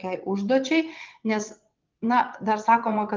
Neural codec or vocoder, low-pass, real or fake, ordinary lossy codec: vocoder, 22.05 kHz, 80 mel bands, WaveNeXt; 7.2 kHz; fake; Opus, 32 kbps